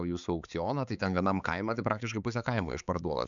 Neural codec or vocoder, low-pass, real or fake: codec, 16 kHz, 4 kbps, X-Codec, HuBERT features, trained on balanced general audio; 7.2 kHz; fake